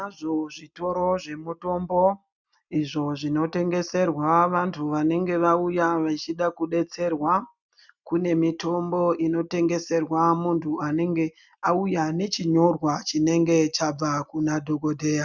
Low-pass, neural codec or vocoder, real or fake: 7.2 kHz; none; real